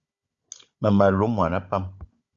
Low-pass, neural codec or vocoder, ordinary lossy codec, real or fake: 7.2 kHz; codec, 16 kHz, 16 kbps, FunCodec, trained on Chinese and English, 50 frames a second; Opus, 64 kbps; fake